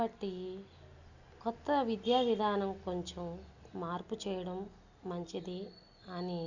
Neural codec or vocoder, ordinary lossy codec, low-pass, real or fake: none; none; 7.2 kHz; real